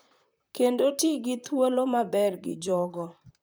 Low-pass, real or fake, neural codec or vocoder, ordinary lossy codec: none; fake; vocoder, 44.1 kHz, 128 mel bands, Pupu-Vocoder; none